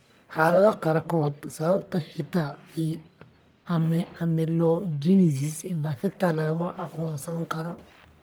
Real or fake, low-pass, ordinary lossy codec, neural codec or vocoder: fake; none; none; codec, 44.1 kHz, 1.7 kbps, Pupu-Codec